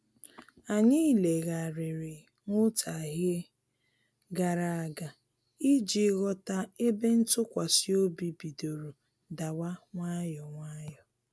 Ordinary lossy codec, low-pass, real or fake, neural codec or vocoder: none; none; real; none